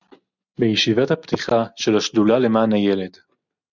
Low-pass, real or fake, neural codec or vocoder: 7.2 kHz; real; none